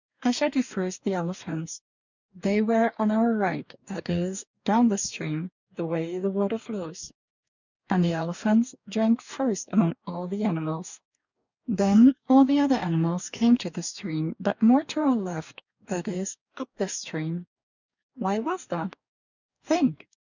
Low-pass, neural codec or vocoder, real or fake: 7.2 kHz; codec, 44.1 kHz, 2.6 kbps, DAC; fake